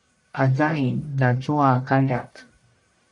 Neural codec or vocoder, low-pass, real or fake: codec, 44.1 kHz, 1.7 kbps, Pupu-Codec; 10.8 kHz; fake